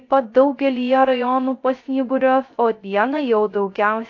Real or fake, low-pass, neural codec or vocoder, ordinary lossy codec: fake; 7.2 kHz; codec, 16 kHz, 0.3 kbps, FocalCodec; AAC, 48 kbps